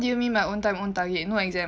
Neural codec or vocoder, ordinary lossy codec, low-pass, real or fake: none; none; none; real